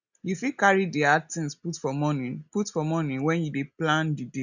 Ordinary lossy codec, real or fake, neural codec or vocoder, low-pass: none; real; none; 7.2 kHz